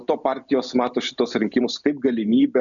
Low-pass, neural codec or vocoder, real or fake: 7.2 kHz; none; real